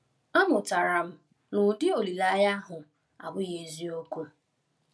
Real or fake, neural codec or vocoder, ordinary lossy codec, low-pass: real; none; none; none